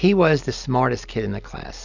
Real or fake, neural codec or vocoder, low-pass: real; none; 7.2 kHz